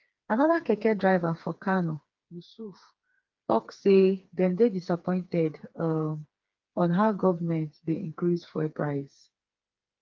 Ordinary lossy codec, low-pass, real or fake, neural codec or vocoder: Opus, 32 kbps; 7.2 kHz; fake; codec, 16 kHz, 4 kbps, FreqCodec, smaller model